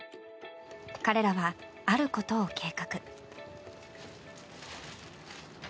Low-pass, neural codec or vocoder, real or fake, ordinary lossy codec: none; none; real; none